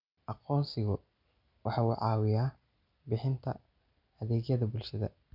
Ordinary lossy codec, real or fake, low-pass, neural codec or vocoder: none; real; 5.4 kHz; none